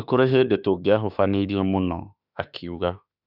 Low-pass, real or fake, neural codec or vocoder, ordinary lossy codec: 5.4 kHz; fake; autoencoder, 48 kHz, 32 numbers a frame, DAC-VAE, trained on Japanese speech; none